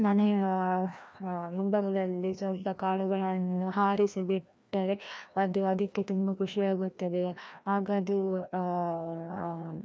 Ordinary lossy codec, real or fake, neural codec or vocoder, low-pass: none; fake; codec, 16 kHz, 1 kbps, FreqCodec, larger model; none